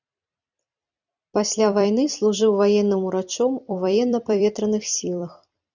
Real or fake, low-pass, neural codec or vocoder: fake; 7.2 kHz; vocoder, 44.1 kHz, 128 mel bands every 256 samples, BigVGAN v2